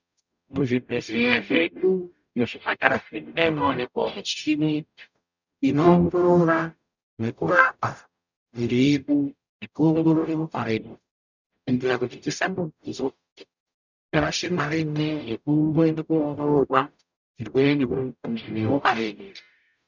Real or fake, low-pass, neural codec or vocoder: fake; 7.2 kHz; codec, 44.1 kHz, 0.9 kbps, DAC